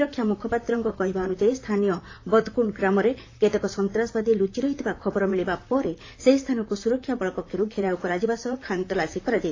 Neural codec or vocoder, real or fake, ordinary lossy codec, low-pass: vocoder, 44.1 kHz, 128 mel bands, Pupu-Vocoder; fake; AAC, 32 kbps; 7.2 kHz